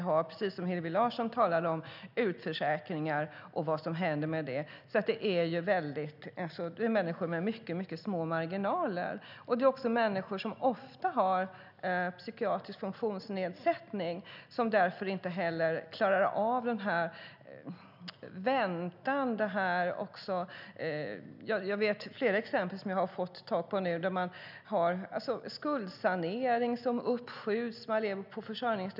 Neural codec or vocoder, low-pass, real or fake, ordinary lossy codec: none; 5.4 kHz; real; none